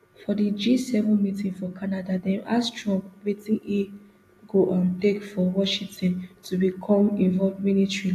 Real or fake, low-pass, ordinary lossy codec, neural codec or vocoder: real; 14.4 kHz; AAC, 64 kbps; none